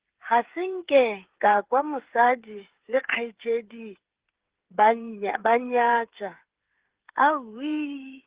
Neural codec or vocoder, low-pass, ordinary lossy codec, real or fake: codec, 16 kHz, 8 kbps, FreqCodec, smaller model; 3.6 kHz; Opus, 16 kbps; fake